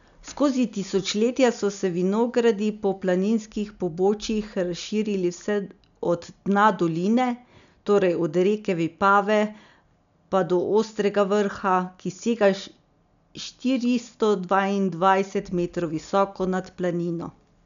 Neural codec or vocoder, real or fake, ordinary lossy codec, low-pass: none; real; none; 7.2 kHz